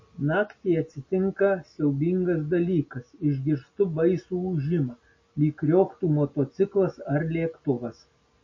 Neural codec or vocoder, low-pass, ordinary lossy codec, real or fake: none; 7.2 kHz; MP3, 32 kbps; real